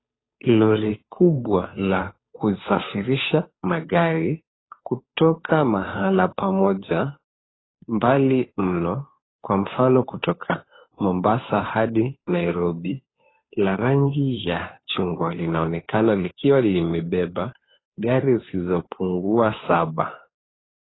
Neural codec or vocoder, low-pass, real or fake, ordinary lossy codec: codec, 16 kHz, 2 kbps, FunCodec, trained on Chinese and English, 25 frames a second; 7.2 kHz; fake; AAC, 16 kbps